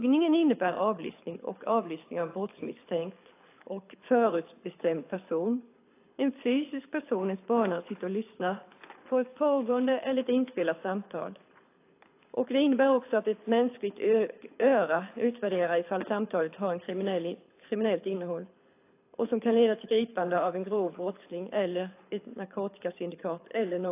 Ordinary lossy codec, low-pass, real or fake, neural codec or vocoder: AAC, 24 kbps; 3.6 kHz; fake; codec, 24 kHz, 6 kbps, HILCodec